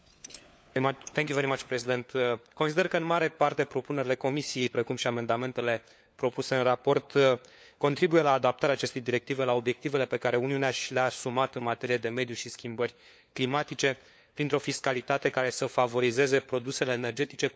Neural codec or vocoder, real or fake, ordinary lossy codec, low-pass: codec, 16 kHz, 4 kbps, FunCodec, trained on LibriTTS, 50 frames a second; fake; none; none